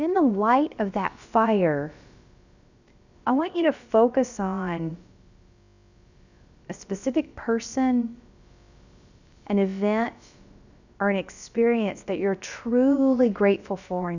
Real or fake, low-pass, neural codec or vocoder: fake; 7.2 kHz; codec, 16 kHz, about 1 kbps, DyCAST, with the encoder's durations